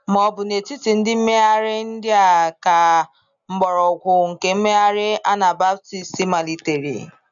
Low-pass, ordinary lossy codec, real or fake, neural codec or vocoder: 7.2 kHz; none; real; none